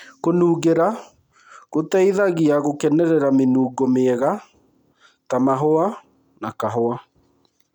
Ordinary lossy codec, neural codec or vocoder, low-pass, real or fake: none; none; 19.8 kHz; real